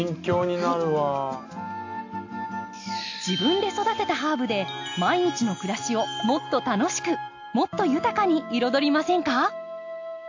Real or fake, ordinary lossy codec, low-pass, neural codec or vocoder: real; AAC, 48 kbps; 7.2 kHz; none